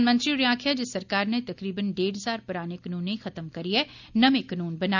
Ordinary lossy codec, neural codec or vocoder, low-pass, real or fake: none; none; 7.2 kHz; real